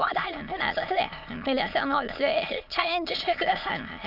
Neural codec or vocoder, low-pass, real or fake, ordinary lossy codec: autoencoder, 22.05 kHz, a latent of 192 numbers a frame, VITS, trained on many speakers; 5.4 kHz; fake; none